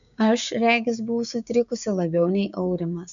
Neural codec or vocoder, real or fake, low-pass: codec, 16 kHz, 6 kbps, DAC; fake; 7.2 kHz